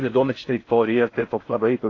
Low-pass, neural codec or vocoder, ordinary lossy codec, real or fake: 7.2 kHz; codec, 16 kHz in and 24 kHz out, 0.6 kbps, FocalCodec, streaming, 4096 codes; AAC, 32 kbps; fake